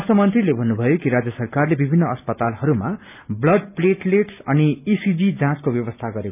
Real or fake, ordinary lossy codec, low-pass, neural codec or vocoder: real; none; 3.6 kHz; none